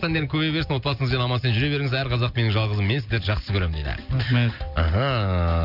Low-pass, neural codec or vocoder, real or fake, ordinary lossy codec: 5.4 kHz; none; real; none